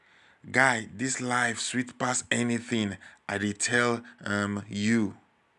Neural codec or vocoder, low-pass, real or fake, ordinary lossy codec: none; 10.8 kHz; real; none